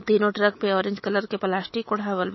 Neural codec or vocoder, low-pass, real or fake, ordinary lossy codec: autoencoder, 48 kHz, 128 numbers a frame, DAC-VAE, trained on Japanese speech; 7.2 kHz; fake; MP3, 24 kbps